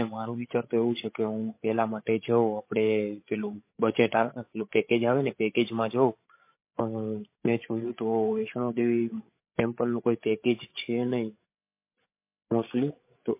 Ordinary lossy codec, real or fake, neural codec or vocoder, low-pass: MP3, 24 kbps; fake; codec, 16 kHz, 16 kbps, FreqCodec, larger model; 3.6 kHz